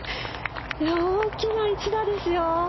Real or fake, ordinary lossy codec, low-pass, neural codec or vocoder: real; MP3, 24 kbps; 7.2 kHz; none